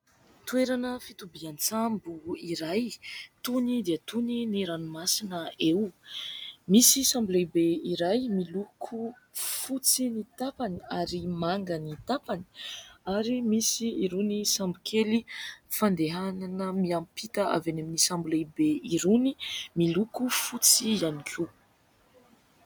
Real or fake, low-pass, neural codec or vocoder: real; 19.8 kHz; none